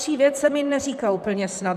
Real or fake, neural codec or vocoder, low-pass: fake; vocoder, 44.1 kHz, 128 mel bands, Pupu-Vocoder; 14.4 kHz